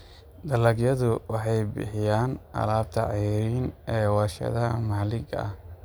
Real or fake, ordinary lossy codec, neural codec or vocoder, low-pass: real; none; none; none